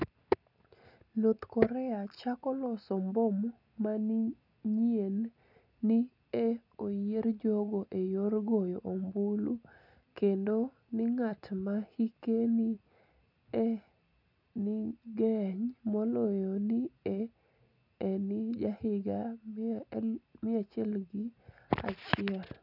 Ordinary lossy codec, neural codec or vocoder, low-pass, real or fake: none; none; 5.4 kHz; real